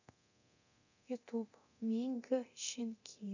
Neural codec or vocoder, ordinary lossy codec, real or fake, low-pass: codec, 24 kHz, 0.9 kbps, DualCodec; none; fake; 7.2 kHz